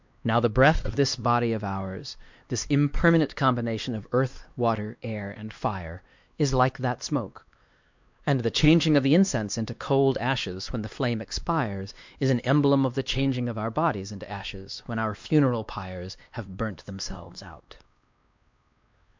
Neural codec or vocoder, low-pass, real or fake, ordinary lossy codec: codec, 16 kHz, 2 kbps, X-Codec, WavLM features, trained on Multilingual LibriSpeech; 7.2 kHz; fake; MP3, 64 kbps